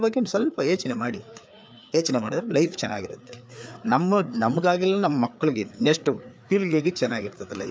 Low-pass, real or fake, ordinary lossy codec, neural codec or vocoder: none; fake; none; codec, 16 kHz, 4 kbps, FreqCodec, larger model